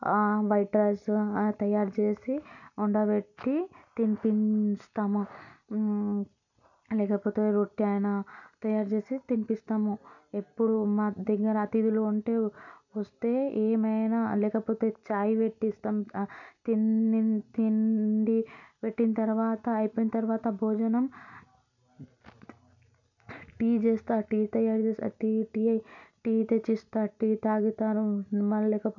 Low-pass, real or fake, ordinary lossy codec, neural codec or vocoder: 7.2 kHz; real; none; none